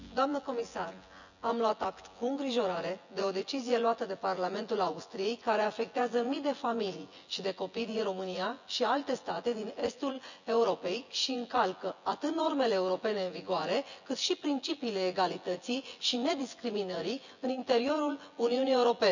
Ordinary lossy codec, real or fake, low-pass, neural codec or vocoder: none; fake; 7.2 kHz; vocoder, 24 kHz, 100 mel bands, Vocos